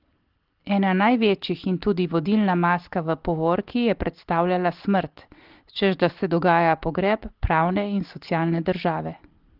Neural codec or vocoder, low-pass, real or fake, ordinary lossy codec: none; 5.4 kHz; real; Opus, 16 kbps